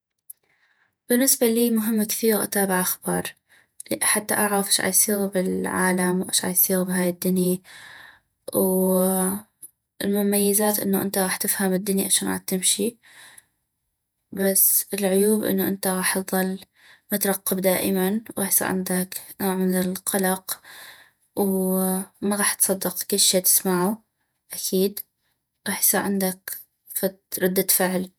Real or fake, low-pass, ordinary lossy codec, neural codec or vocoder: fake; none; none; vocoder, 48 kHz, 128 mel bands, Vocos